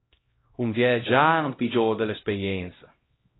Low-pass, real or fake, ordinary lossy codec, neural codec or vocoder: 7.2 kHz; fake; AAC, 16 kbps; codec, 16 kHz, 0.5 kbps, X-Codec, HuBERT features, trained on LibriSpeech